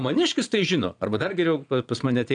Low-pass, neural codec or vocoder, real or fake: 9.9 kHz; vocoder, 22.05 kHz, 80 mel bands, Vocos; fake